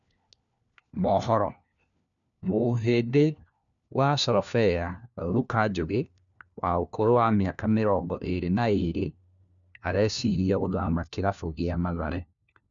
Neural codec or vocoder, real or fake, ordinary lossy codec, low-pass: codec, 16 kHz, 1 kbps, FunCodec, trained on LibriTTS, 50 frames a second; fake; none; 7.2 kHz